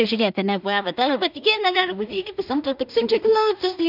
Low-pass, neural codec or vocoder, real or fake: 5.4 kHz; codec, 16 kHz in and 24 kHz out, 0.4 kbps, LongCat-Audio-Codec, two codebook decoder; fake